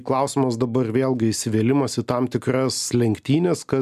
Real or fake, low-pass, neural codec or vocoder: real; 14.4 kHz; none